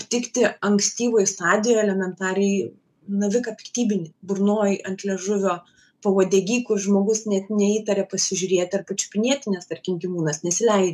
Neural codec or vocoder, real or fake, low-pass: none; real; 14.4 kHz